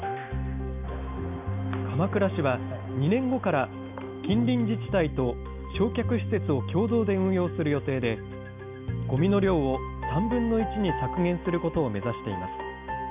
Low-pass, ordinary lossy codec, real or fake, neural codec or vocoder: 3.6 kHz; none; real; none